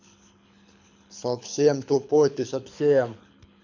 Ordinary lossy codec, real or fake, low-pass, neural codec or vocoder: none; fake; 7.2 kHz; codec, 24 kHz, 6 kbps, HILCodec